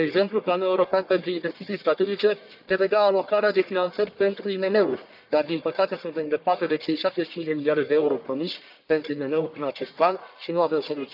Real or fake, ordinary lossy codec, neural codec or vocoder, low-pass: fake; none; codec, 44.1 kHz, 1.7 kbps, Pupu-Codec; 5.4 kHz